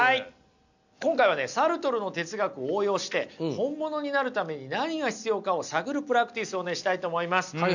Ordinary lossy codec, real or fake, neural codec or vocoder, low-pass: none; real; none; 7.2 kHz